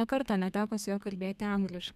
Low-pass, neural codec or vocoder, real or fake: 14.4 kHz; codec, 32 kHz, 1.9 kbps, SNAC; fake